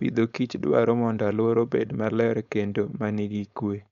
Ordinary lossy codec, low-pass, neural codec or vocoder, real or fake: none; 7.2 kHz; codec, 16 kHz, 4.8 kbps, FACodec; fake